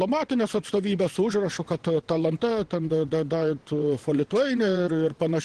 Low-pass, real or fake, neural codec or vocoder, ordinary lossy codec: 9.9 kHz; fake; vocoder, 22.05 kHz, 80 mel bands, WaveNeXt; Opus, 16 kbps